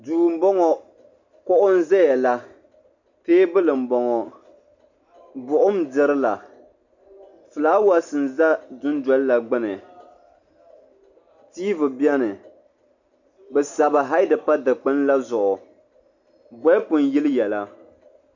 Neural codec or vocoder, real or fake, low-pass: none; real; 7.2 kHz